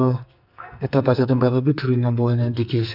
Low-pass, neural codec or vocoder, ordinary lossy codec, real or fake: 5.4 kHz; codec, 44.1 kHz, 2.6 kbps, SNAC; none; fake